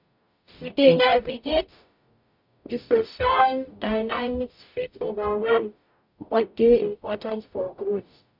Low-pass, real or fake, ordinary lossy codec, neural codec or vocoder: 5.4 kHz; fake; none; codec, 44.1 kHz, 0.9 kbps, DAC